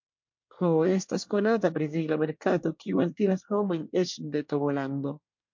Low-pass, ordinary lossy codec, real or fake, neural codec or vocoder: 7.2 kHz; MP3, 48 kbps; fake; codec, 24 kHz, 1 kbps, SNAC